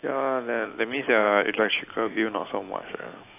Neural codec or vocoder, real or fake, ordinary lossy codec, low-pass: none; real; AAC, 24 kbps; 3.6 kHz